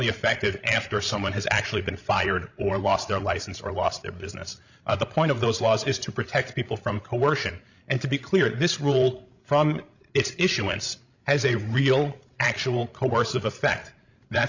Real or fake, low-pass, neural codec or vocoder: fake; 7.2 kHz; codec, 16 kHz, 16 kbps, FreqCodec, larger model